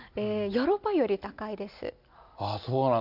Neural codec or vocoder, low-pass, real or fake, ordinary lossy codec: none; 5.4 kHz; real; none